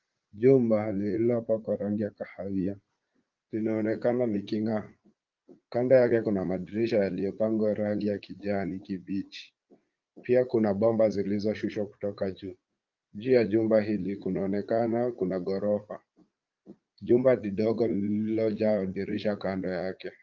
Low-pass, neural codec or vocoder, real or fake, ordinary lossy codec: 7.2 kHz; vocoder, 44.1 kHz, 80 mel bands, Vocos; fake; Opus, 32 kbps